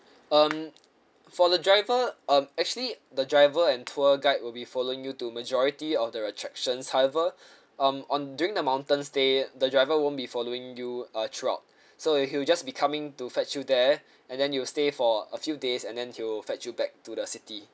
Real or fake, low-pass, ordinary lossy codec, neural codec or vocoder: real; none; none; none